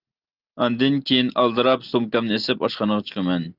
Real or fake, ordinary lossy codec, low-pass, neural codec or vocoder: real; Opus, 32 kbps; 5.4 kHz; none